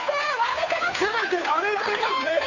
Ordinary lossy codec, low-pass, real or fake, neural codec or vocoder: none; 7.2 kHz; fake; codec, 16 kHz, 2 kbps, FunCodec, trained on Chinese and English, 25 frames a second